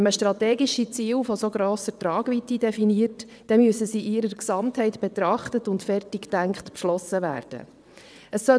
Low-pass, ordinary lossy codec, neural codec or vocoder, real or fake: none; none; vocoder, 22.05 kHz, 80 mel bands, WaveNeXt; fake